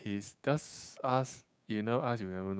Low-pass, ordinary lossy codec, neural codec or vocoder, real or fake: none; none; codec, 16 kHz, 6 kbps, DAC; fake